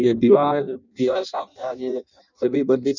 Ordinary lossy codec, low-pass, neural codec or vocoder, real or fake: none; 7.2 kHz; codec, 16 kHz in and 24 kHz out, 0.6 kbps, FireRedTTS-2 codec; fake